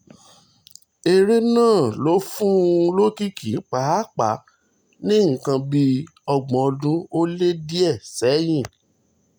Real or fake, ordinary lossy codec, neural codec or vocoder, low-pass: real; none; none; none